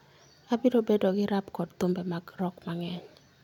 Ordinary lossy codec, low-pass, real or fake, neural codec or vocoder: none; 19.8 kHz; real; none